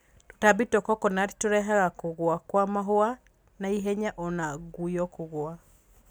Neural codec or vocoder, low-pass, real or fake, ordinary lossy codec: none; none; real; none